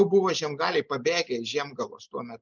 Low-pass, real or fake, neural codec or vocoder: 7.2 kHz; real; none